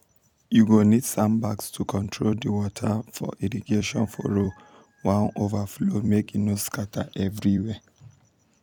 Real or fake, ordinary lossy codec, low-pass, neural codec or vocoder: fake; none; 19.8 kHz; vocoder, 44.1 kHz, 128 mel bands every 256 samples, BigVGAN v2